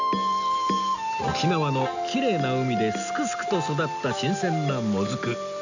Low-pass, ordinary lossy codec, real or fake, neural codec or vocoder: 7.2 kHz; none; real; none